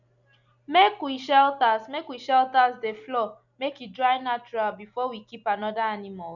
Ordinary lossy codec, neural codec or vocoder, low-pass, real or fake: none; none; 7.2 kHz; real